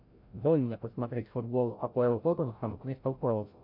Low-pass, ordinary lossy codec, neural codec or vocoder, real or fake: 5.4 kHz; AAC, 32 kbps; codec, 16 kHz, 0.5 kbps, FreqCodec, larger model; fake